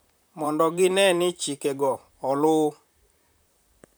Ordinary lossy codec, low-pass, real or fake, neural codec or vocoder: none; none; fake; vocoder, 44.1 kHz, 128 mel bands, Pupu-Vocoder